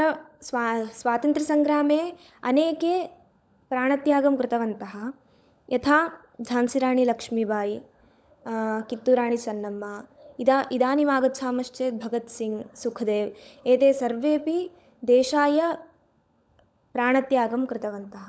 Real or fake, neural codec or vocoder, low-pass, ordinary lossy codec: fake; codec, 16 kHz, 16 kbps, FunCodec, trained on LibriTTS, 50 frames a second; none; none